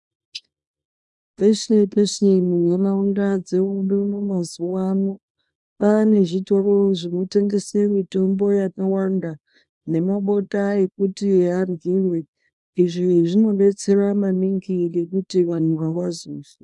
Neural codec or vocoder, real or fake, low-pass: codec, 24 kHz, 0.9 kbps, WavTokenizer, small release; fake; 10.8 kHz